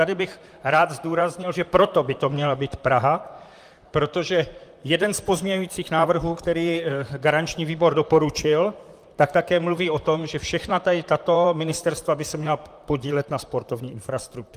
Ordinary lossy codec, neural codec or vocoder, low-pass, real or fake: Opus, 32 kbps; vocoder, 44.1 kHz, 128 mel bands, Pupu-Vocoder; 14.4 kHz; fake